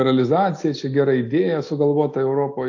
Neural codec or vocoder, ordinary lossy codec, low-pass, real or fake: none; AAC, 48 kbps; 7.2 kHz; real